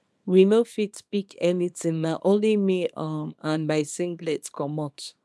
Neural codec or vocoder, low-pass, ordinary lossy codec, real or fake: codec, 24 kHz, 0.9 kbps, WavTokenizer, small release; none; none; fake